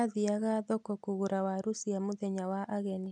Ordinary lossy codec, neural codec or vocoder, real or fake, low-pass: none; none; real; 10.8 kHz